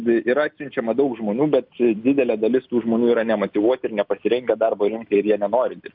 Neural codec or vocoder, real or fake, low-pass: none; real; 5.4 kHz